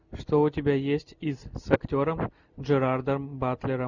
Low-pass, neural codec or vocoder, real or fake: 7.2 kHz; none; real